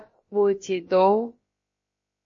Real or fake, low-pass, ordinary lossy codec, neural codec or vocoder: fake; 7.2 kHz; MP3, 32 kbps; codec, 16 kHz, about 1 kbps, DyCAST, with the encoder's durations